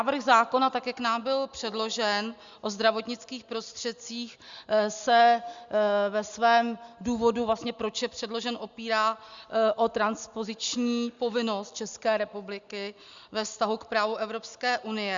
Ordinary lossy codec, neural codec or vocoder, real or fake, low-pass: Opus, 64 kbps; none; real; 7.2 kHz